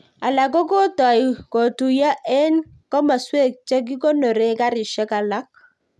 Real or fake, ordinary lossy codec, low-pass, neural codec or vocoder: real; none; none; none